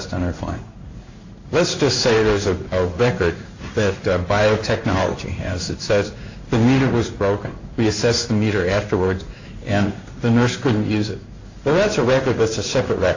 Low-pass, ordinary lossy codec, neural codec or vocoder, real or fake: 7.2 kHz; AAC, 48 kbps; codec, 16 kHz in and 24 kHz out, 1 kbps, XY-Tokenizer; fake